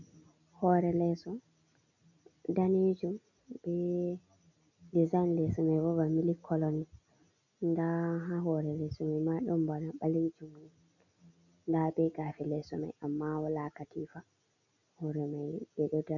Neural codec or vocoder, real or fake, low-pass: none; real; 7.2 kHz